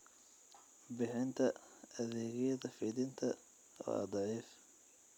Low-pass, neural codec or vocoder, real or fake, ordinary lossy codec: 19.8 kHz; none; real; none